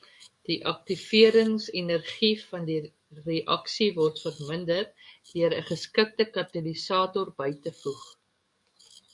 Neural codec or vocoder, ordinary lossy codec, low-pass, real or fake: codec, 44.1 kHz, 7.8 kbps, DAC; MP3, 64 kbps; 10.8 kHz; fake